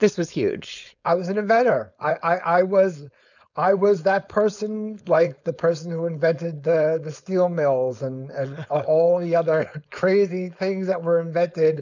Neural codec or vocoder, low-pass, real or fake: codec, 16 kHz, 4.8 kbps, FACodec; 7.2 kHz; fake